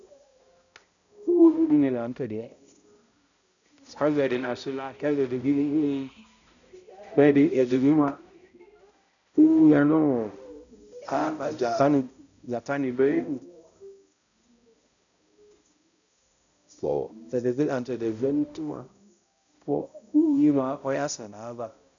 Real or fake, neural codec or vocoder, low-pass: fake; codec, 16 kHz, 0.5 kbps, X-Codec, HuBERT features, trained on balanced general audio; 7.2 kHz